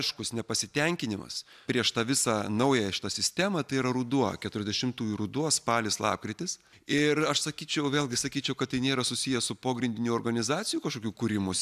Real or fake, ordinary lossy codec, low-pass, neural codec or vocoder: real; AAC, 96 kbps; 14.4 kHz; none